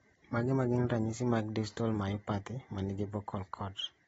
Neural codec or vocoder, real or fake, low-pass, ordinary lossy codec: none; real; 19.8 kHz; AAC, 24 kbps